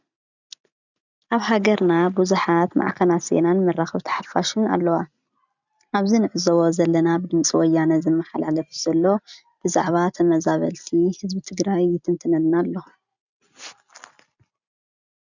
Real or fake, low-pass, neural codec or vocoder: real; 7.2 kHz; none